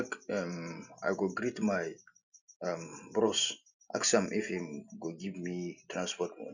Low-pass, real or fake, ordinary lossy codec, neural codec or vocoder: 7.2 kHz; real; none; none